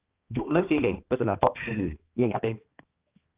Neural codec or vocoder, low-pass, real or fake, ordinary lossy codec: codec, 16 kHz, 2 kbps, X-Codec, HuBERT features, trained on balanced general audio; 3.6 kHz; fake; Opus, 24 kbps